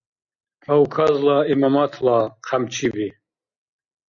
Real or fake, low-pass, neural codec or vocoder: real; 7.2 kHz; none